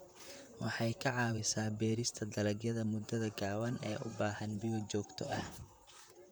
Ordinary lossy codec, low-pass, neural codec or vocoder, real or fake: none; none; vocoder, 44.1 kHz, 128 mel bands, Pupu-Vocoder; fake